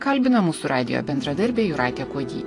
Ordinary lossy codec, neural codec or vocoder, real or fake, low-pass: AAC, 48 kbps; vocoder, 48 kHz, 128 mel bands, Vocos; fake; 10.8 kHz